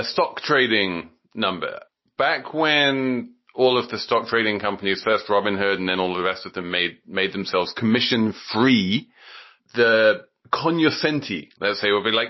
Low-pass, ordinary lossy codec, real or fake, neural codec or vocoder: 7.2 kHz; MP3, 24 kbps; real; none